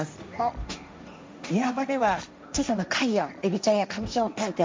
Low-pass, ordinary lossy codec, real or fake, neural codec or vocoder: none; none; fake; codec, 16 kHz, 1.1 kbps, Voila-Tokenizer